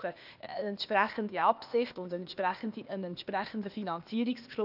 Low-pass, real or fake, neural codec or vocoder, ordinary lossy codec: 5.4 kHz; fake; codec, 16 kHz, 0.8 kbps, ZipCodec; none